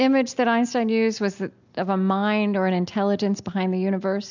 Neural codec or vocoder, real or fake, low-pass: none; real; 7.2 kHz